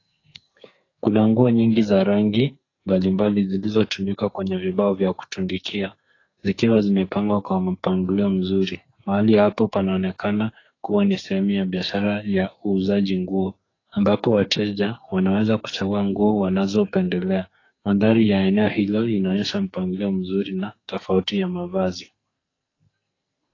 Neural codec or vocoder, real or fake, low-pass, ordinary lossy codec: codec, 44.1 kHz, 2.6 kbps, SNAC; fake; 7.2 kHz; AAC, 32 kbps